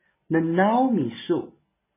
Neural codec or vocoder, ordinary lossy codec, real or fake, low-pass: none; MP3, 16 kbps; real; 3.6 kHz